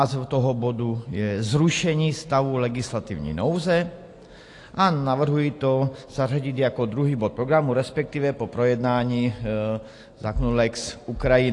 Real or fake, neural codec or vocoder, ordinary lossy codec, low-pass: real; none; AAC, 48 kbps; 10.8 kHz